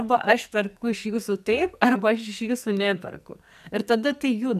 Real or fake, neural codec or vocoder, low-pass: fake; codec, 44.1 kHz, 2.6 kbps, SNAC; 14.4 kHz